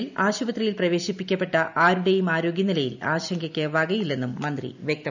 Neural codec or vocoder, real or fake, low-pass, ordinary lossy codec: none; real; 7.2 kHz; none